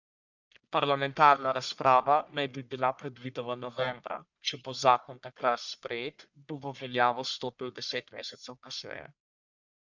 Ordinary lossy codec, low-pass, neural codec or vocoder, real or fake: none; 7.2 kHz; codec, 44.1 kHz, 1.7 kbps, Pupu-Codec; fake